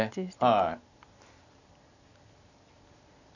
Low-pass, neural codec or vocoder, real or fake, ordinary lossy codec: 7.2 kHz; none; real; none